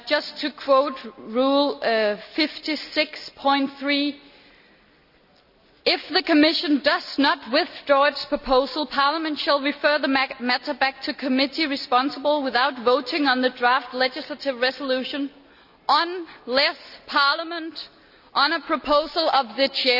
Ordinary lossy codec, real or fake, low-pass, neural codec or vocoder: none; real; 5.4 kHz; none